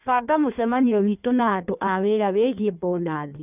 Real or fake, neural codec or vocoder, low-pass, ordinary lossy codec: fake; codec, 16 kHz in and 24 kHz out, 1.1 kbps, FireRedTTS-2 codec; 3.6 kHz; none